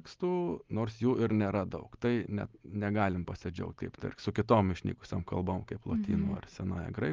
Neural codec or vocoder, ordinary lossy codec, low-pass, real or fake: none; Opus, 32 kbps; 7.2 kHz; real